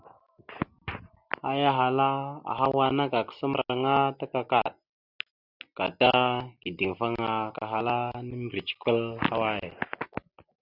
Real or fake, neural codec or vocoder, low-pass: real; none; 5.4 kHz